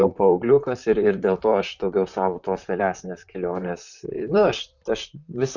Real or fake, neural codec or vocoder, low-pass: fake; vocoder, 44.1 kHz, 128 mel bands, Pupu-Vocoder; 7.2 kHz